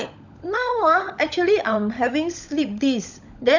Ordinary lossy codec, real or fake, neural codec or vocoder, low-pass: none; fake; codec, 16 kHz, 16 kbps, FunCodec, trained on LibriTTS, 50 frames a second; 7.2 kHz